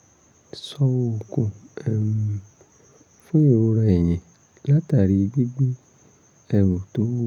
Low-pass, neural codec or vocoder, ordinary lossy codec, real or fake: 19.8 kHz; none; none; real